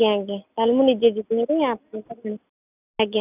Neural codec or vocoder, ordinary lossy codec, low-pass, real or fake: none; none; 3.6 kHz; real